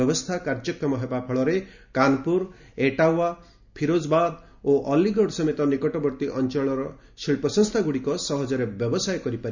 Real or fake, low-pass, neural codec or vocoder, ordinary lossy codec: real; 7.2 kHz; none; none